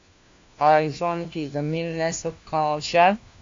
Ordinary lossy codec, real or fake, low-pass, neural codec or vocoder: AAC, 48 kbps; fake; 7.2 kHz; codec, 16 kHz, 1 kbps, FunCodec, trained on LibriTTS, 50 frames a second